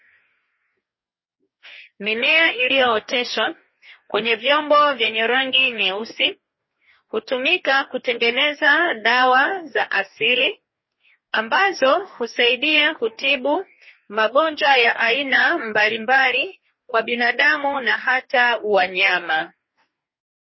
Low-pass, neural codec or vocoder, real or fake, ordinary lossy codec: 7.2 kHz; codec, 44.1 kHz, 2.6 kbps, DAC; fake; MP3, 24 kbps